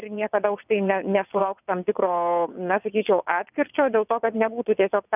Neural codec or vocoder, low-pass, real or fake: vocoder, 22.05 kHz, 80 mel bands, WaveNeXt; 3.6 kHz; fake